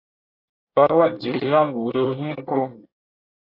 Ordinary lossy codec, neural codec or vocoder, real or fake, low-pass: AAC, 24 kbps; codec, 24 kHz, 1 kbps, SNAC; fake; 5.4 kHz